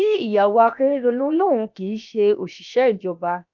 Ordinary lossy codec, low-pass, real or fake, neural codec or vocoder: none; 7.2 kHz; fake; codec, 16 kHz, 0.7 kbps, FocalCodec